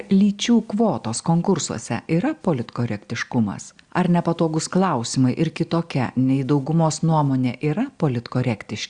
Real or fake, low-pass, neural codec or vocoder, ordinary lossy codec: real; 9.9 kHz; none; Opus, 64 kbps